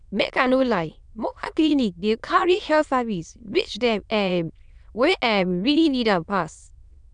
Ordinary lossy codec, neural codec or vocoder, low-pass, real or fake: none; autoencoder, 22.05 kHz, a latent of 192 numbers a frame, VITS, trained on many speakers; 9.9 kHz; fake